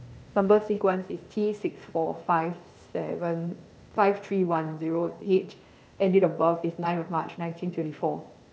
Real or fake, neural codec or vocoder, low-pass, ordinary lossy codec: fake; codec, 16 kHz, 0.8 kbps, ZipCodec; none; none